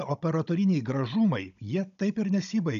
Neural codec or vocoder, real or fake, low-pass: codec, 16 kHz, 16 kbps, FunCodec, trained on Chinese and English, 50 frames a second; fake; 7.2 kHz